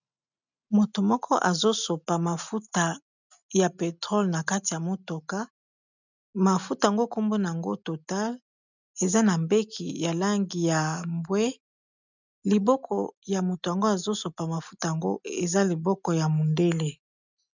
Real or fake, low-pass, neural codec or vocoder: real; 7.2 kHz; none